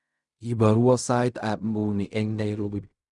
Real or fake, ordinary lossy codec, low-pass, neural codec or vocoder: fake; none; 10.8 kHz; codec, 16 kHz in and 24 kHz out, 0.4 kbps, LongCat-Audio-Codec, fine tuned four codebook decoder